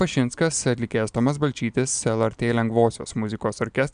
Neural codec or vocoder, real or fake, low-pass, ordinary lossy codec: none; real; 9.9 kHz; MP3, 96 kbps